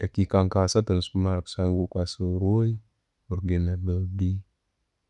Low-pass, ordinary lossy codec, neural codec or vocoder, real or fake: 10.8 kHz; none; none; real